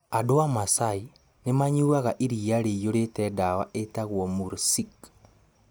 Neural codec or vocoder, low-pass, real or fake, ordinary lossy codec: none; none; real; none